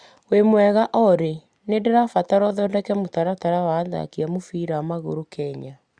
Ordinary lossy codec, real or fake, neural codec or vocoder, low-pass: Opus, 64 kbps; real; none; 9.9 kHz